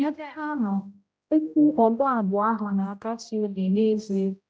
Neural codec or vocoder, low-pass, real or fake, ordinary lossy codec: codec, 16 kHz, 0.5 kbps, X-Codec, HuBERT features, trained on general audio; none; fake; none